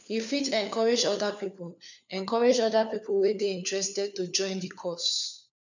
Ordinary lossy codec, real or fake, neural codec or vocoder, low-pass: none; fake; codec, 16 kHz, 4 kbps, FunCodec, trained on LibriTTS, 50 frames a second; 7.2 kHz